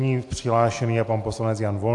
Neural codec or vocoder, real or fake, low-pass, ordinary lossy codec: none; real; 10.8 kHz; AAC, 64 kbps